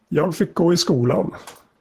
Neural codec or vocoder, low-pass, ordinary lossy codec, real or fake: vocoder, 44.1 kHz, 128 mel bands, Pupu-Vocoder; 14.4 kHz; Opus, 16 kbps; fake